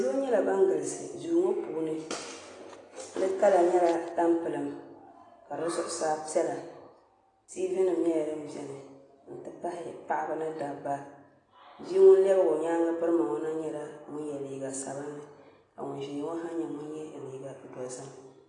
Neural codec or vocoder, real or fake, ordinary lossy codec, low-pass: none; real; AAC, 32 kbps; 10.8 kHz